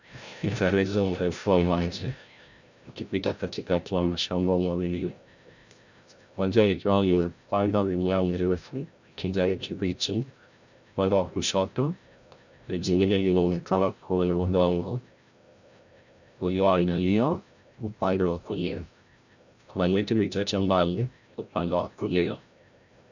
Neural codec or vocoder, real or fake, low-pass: codec, 16 kHz, 0.5 kbps, FreqCodec, larger model; fake; 7.2 kHz